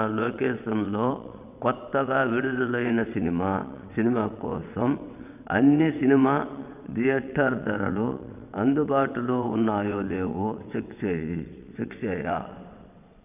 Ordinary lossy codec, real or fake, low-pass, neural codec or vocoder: none; fake; 3.6 kHz; vocoder, 22.05 kHz, 80 mel bands, WaveNeXt